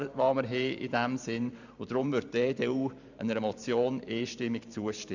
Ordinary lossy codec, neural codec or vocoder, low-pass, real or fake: none; vocoder, 44.1 kHz, 128 mel bands every 512 samples, BigVGAN v2; 7.2 kHz; fake